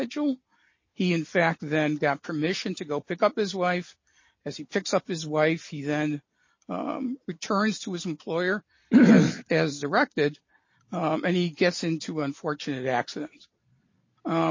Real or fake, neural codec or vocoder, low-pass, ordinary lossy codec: real; none; 7.2 kHz; MP3, 32 kbps